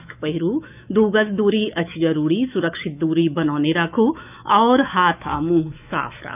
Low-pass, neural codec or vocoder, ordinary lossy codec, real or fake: 3.6 kHz; autoencoder, 48 kHz, 128 numbers a frame, DAC-VAE, trained on Japanese speech; none; fake